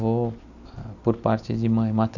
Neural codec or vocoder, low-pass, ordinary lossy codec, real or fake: none; 7.2 kHz; none; real